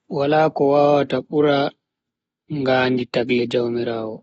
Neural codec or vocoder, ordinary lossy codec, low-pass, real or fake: none; AAC, 24 kbps; 19.8 kHz; real